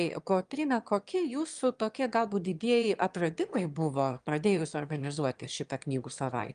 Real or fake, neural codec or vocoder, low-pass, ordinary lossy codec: fake; autoencoder, 22.05 kHz, a latent of 192 numbers a frame, VITS, trained on one speaker; 9.9 kHz; Opus, 32 kbps